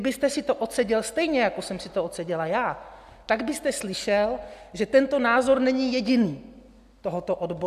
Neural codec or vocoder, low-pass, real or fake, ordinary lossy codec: none; 14.4 kHz; real; AAC, 96 kbps